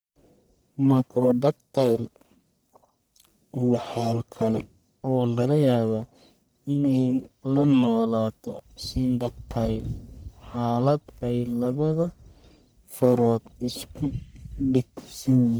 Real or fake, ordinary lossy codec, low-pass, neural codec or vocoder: fake; none; none; codec, 44.1 kHz, 1.7 kbps, Pupu-Codec